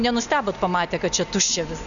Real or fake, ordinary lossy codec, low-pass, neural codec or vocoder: real; MP3, 96 kbps; 7.2 kHz; none